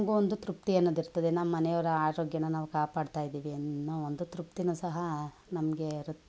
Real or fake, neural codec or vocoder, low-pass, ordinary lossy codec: real; none; none; none